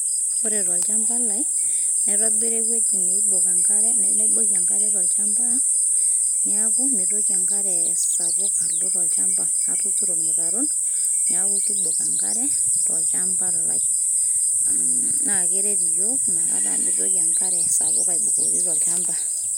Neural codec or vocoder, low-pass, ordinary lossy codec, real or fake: none; none; none; real